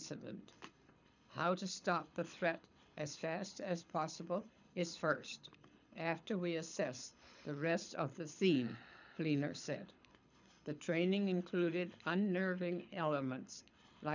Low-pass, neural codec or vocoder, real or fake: 7.2 kHz; codec, 24 kHz, 6 kbps, HILCodec; fake